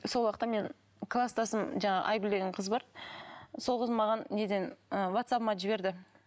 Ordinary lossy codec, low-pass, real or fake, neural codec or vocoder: none; none; real; none